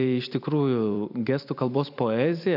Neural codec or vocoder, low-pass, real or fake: vocoder, 44.1 kHz, 128 mel bands every 256 samples, BigVGAN v2; 5.4 kHz; fake